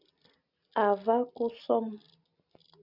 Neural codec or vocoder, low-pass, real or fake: none; 5.4 kHz; real